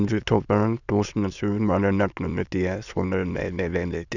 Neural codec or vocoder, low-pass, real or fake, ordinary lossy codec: autoencoder, 22.05 kHz, a latent of 192 numbers a frame, VITS, trained on many speakers; 7.2 kHz; fake; none